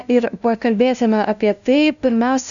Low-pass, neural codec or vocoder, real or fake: 7.2 kHz; codec, 16 kHz, 0.5 kbps, FunCodec, trained on LibriTTS, 25 frames a second; fake